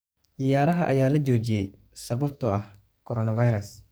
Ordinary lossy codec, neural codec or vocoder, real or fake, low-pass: none; codec, 44.1 kHz, 2.6 kbps, SNAC; fake; none